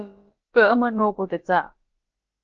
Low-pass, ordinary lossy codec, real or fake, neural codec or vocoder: 7.2 kHz; Opus, 16 kbps; fake; codec, 16 kHz, about 1 kbps, DyCAST, with the encoder's durations